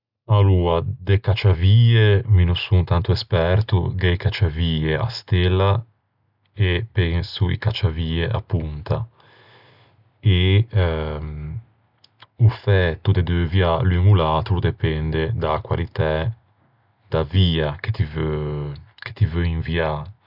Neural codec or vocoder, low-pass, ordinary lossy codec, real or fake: none; 5.4 kHz; none; real